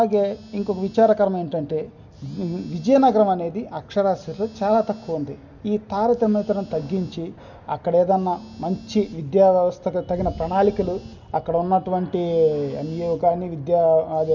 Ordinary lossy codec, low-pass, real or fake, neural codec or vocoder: none; 7.2 kHz; real; none